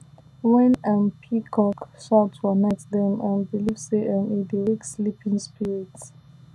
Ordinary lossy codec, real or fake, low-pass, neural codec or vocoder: none; real; none; none